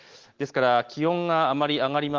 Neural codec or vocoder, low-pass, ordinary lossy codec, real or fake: none; 7.2 kHz; Opus, 16 kbps; real